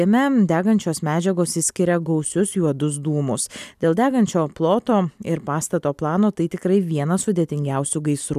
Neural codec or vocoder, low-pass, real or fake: none; 14.4 kHz; real